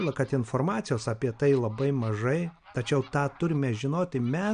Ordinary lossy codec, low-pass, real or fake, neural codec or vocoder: MP3, 96 kbps; 9.9 kHz; real; none